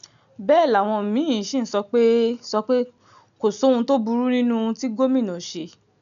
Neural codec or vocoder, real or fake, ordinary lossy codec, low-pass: none; real; MP3, 96 kbps; 7.2 kHz